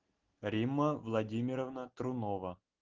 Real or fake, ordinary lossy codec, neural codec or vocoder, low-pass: real; Opus, 16 kbps; none; 7.2 kHz